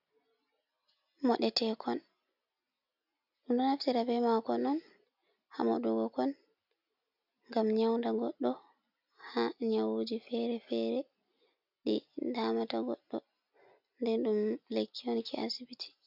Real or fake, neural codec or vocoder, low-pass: real; none; 5.4 kHz